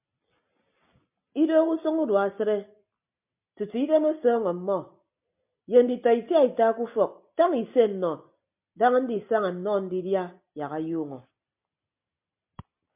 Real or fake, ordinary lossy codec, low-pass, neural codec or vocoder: real; MP3, 32 kbps; 3.6 kHz; none